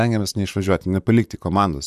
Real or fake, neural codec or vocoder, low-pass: fake; vocoder, 44.1 kHz, 128 mel bands, Pupu-Vocoder; 14.4 kHz